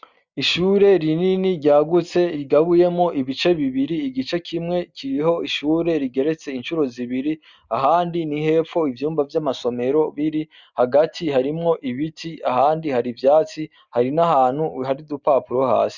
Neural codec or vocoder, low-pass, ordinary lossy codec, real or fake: none; 7.2 kHz; Opus, 64 kbps; real